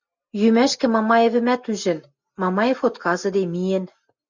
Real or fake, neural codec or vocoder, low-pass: real; none; 7.2 kHz